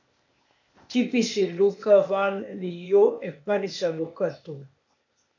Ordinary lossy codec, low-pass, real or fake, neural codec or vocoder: MP3, 64 kbps; 7.2 kHz; fake; codec, 16 kHz, 0.8 kbps, ZipCodec